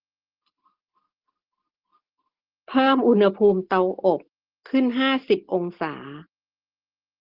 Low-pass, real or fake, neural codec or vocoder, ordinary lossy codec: 5.4 kHz; real; none; Opus, 16 kbps